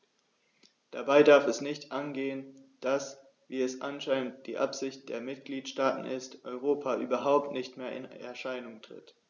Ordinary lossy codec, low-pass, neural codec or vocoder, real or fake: none; 7.2 kHz; none; real